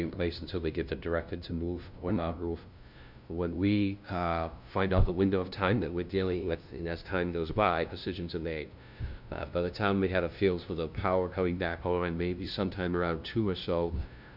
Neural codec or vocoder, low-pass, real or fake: codec, 16 kHz, 0.5 kbps, FunCodec, trained on LibriTTS, 25 frames a second; 5.4 kHz; fake